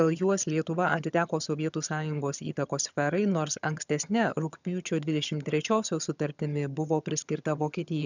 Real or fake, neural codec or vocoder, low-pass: fake; vocoder, 22.05 kHz, 80 mel bands, HiFi-GAN; 7.2 kHz